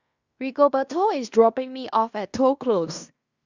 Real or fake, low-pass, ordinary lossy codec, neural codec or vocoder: fake; 7.2 kHz; Opus, 64 kbps; codec, 16 kHz in and 24 kHz out, 0.9 kbps, LongCat-Audio-Codec, fine tuned four codebook decoder